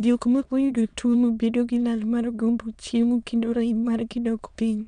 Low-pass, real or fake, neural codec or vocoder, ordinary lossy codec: 9.9 kHz; fake; autoencoder, 22.05 kHz, a latent of 192 numbers a frame, VITS, trained on many speakers; none